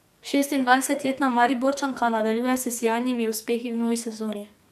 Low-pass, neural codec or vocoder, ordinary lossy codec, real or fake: 14.4 kHz; codec, 44.1 kHz, 2.6 kbps, SNAC; AAC, 96 kbps; fake